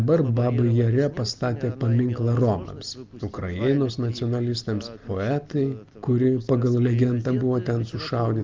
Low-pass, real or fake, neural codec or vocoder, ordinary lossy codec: 7.2 kHz; real; none; Opus, 24 kbps